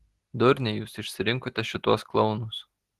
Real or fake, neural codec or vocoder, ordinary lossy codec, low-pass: real; none; Opus, 16 kbps; 14.4 kHz